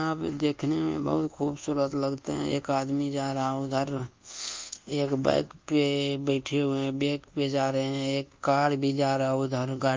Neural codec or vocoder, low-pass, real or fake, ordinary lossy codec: codec, 24 kHz, 1.2 kbps, DualCodec; 7.2 kHz; fake; Opus, 24 kbps